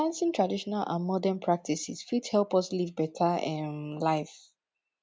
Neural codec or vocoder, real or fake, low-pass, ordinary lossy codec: none; real; none; none